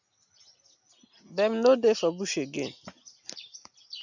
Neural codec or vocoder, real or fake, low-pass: none; real; 7.2 kHz